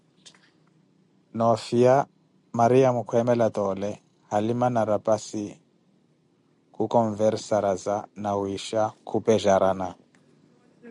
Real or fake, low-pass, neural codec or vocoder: real; 10.8 kHz; none